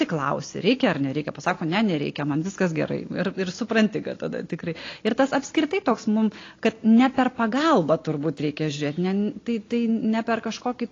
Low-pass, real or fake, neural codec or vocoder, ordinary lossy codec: 7.2 kHz; real; none; AAC, 32 kbps